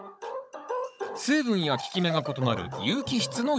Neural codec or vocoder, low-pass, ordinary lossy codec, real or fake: codec, 16 kHz, 16 kbps, FunCodec, trained on Chinese and English, 50 frames a second; none; none; fake